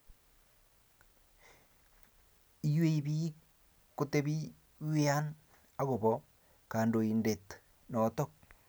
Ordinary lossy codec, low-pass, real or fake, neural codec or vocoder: none; none; real; none